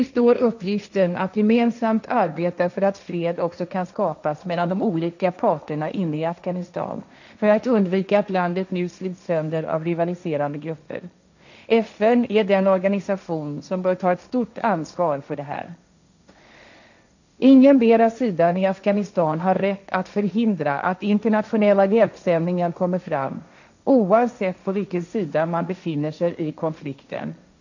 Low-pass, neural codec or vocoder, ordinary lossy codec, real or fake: 7.2 kHz; codec, 16 kHz, 1.1 kbps, Voila-Tokenizer; none; fake